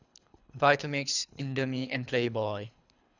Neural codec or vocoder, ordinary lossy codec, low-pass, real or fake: codec, 24 kHz, 3 kbps, HILCodec; none; 7.2 kHz; fake